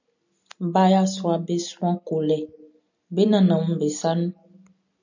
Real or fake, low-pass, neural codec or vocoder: real; 7.2 kHz; none